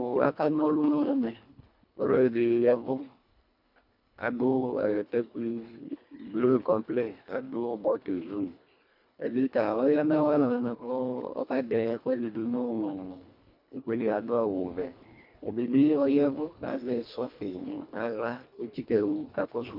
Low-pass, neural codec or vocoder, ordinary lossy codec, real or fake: 5.4 kHz; codec, 24 kHz, 1.5 kbps, HILCodec; MP3, 48 kbps; fake